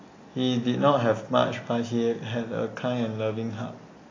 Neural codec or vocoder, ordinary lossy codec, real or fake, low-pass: none; AAC, 32 kbps; real; 7.2 kHz